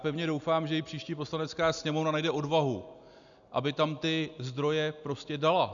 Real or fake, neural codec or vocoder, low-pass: real; none; 7.2 kHz